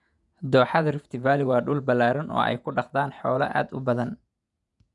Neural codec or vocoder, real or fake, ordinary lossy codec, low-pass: vocoder, 48 kHz, 128 mel bands, Vocos; fake; none; 10.8 kHz